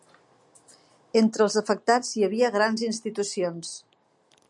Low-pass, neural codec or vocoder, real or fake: 10.8 kHz; none; real